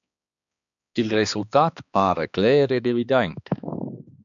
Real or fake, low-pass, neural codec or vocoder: fake; 7.2 kHz; codec, 16 kHz, 2 kbps, X-Codec, HuBERT features, trained on balanced general audio